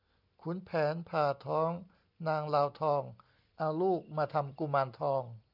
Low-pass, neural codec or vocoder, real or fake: 5.4 kHz; none; real